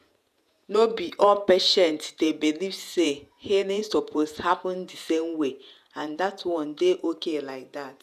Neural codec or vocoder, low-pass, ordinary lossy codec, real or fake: vocoder, 48 kHz, 128 mel bands, Vocos; 14.4 kHz; none; fake